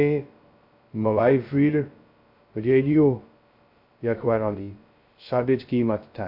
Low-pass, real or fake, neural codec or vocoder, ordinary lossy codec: 5.4 kHz; fake; codec, 16 kHz, 0.2 kbps, FocalCodec; AAC, 48 kbps